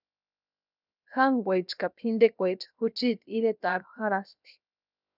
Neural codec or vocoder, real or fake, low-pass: codec, 16 kHz, 0.7 kbps, FocalCodec; fake; 5.4 kHz